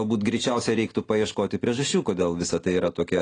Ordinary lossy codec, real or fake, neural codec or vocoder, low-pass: AAC, 32 kbps; real; none; 9.9 kHz